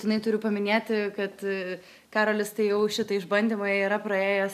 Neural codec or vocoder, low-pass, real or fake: none; 14.4 kHz; real